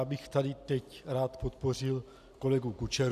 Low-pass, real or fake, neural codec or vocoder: 14.4 kHz; real; none